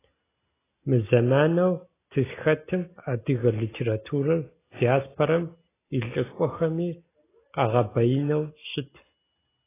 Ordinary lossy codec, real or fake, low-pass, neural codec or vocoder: AAC, 16 kbps; real; 3.6 kHz; none